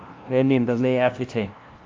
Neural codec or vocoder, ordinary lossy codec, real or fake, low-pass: codec, 16 kHz, 0.5 kbps, FunCodec, trained on LibriTTS, 25 frames a second; Opus, 24 kbps; fake; 7.2 kHz